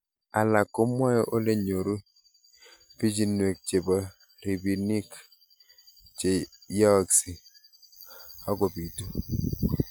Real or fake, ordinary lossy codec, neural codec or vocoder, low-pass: real; none; none; none